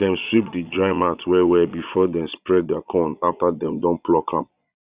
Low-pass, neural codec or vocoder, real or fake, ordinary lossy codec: 3.6 kHz; vocoder, 22.05 kHz, 80 mel bands, Vocos; fake; Opus, 64 kbps